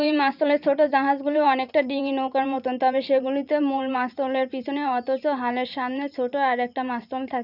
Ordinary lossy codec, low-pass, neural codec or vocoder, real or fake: none; 5.4 kHz; vocoder, 44.1 kHz, 128 mel bands every 512 samples, BigVGAN v2; fake